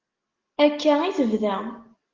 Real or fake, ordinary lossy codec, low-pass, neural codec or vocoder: real; Opus, 16 kbps; 7.2 kHz; none